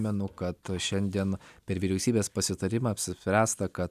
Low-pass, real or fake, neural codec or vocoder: 14.4 kHz; real; none